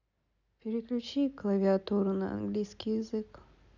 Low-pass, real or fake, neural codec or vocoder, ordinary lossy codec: 7.2 kHz; real; none; none